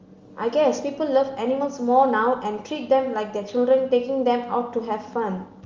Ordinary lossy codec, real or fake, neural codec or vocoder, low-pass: Opus, 32 kbps; real; none; 7.2 kHz